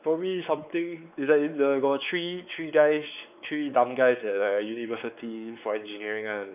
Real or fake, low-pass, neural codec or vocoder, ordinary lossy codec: fake; 3.6 kHz; codec, 16 kHz, 4 kbps, X-Codec, WavLM features, trained on Multilingual LibriSpeech; none